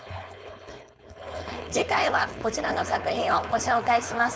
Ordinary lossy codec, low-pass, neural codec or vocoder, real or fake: none; none; codec, 16 kHz, 4.8 kbps, FACodec; fake